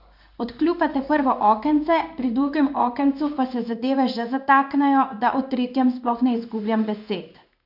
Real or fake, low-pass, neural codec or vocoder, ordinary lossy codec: fake; 5.4 kHz; codec, 16 kHz in and 24 kHz out, 1 kbps, XY-Tokenizer; none